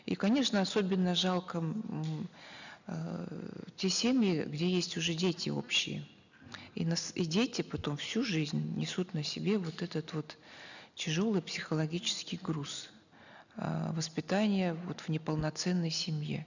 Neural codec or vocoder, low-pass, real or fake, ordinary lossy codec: none; 7.2 kHz; real; none